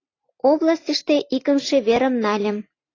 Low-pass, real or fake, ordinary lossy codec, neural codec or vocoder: 7.2 kHz; real; AAC, 32 kbps; none